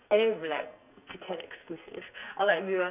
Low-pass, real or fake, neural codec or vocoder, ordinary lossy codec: 3.6 kHz; fake; codec, 44.1 kHz, 2.6 kbps, SNAC; none